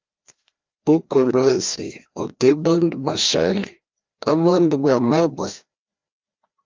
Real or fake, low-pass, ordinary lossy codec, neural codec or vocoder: fake; 7.2 kHz; Opus, 32 kbps; codec, 16 kHz, 1 kbps, FreqCodec, larger model